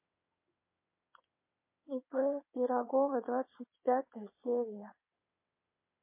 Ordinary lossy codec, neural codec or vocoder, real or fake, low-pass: MP3, 24 kbps; vocoder, 22.05 kHz, 80 mel bands, WaveNeXt; fake; 3.6 kHz